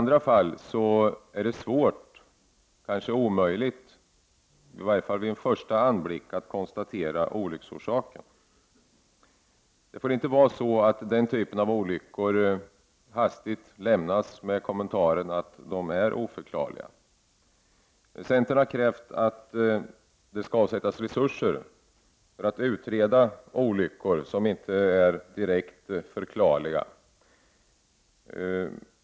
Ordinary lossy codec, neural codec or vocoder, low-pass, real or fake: none; none; none; real